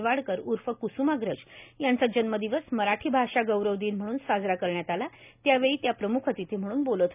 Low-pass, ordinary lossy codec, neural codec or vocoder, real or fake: 3.6 kHz; none; none; real